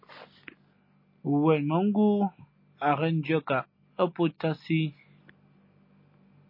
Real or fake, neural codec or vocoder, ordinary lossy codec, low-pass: real; none; MP3, 24 kbps; 5.4 kHz